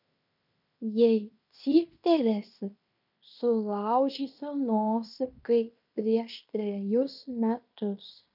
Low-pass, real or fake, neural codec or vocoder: 5.4 kHz; fake; codec, 16 kHz in and 24 kHz out, 0.9 kbps, LongCat-Audio-Codec, fine tuned four codebook decoder